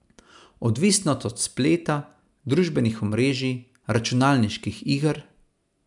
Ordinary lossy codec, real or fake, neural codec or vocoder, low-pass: none; real; none; 10.8 kHz